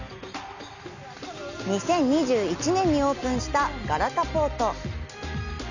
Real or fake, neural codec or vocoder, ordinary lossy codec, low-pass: real; none; none; 7.2 kHz